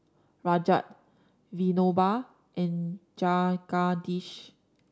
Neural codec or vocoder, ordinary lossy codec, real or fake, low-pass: none; none; real; none